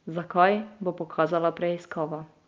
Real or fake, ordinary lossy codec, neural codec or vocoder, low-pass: real; Opus, 24 kbps; none; 7.2 kHz